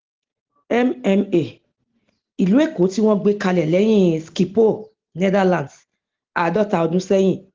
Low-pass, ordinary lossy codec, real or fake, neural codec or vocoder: 7.2 kHz; Opus, 16 kbps; real; none